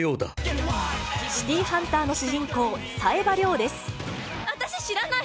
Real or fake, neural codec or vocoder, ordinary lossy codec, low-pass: real; none; none; none